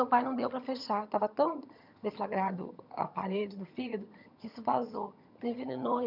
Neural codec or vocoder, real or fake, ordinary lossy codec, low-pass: vocoder, 22.05 kHz, 80 mel bands, HiFi-GAN; fake; none; 5.4 kHz